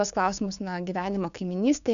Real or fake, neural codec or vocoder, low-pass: fake; codec, 16 kHz, 6 kbps, DAC; 7.2 kHz